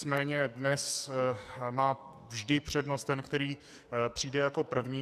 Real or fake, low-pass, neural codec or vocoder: fake; 14.4 kHz; codec, 44.1 kHz, 2.6 kbps, SNAC